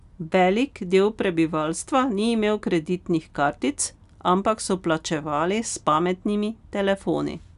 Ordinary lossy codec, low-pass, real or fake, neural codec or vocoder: none; 10.8 kHz; real; none